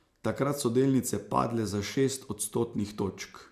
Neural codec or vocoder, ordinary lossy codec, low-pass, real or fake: none; MP3, 96 kbps; 14.4 kHz; real